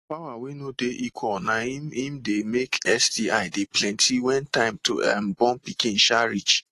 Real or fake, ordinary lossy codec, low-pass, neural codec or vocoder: real; AAC, 48 kbps; 14.4 kHz; none